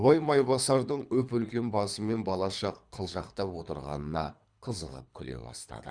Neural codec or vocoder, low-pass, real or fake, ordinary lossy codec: codec, 24 kHz, 3 kbps, HILCodec; 9.9 kHz; fake; Opus, 64 kbps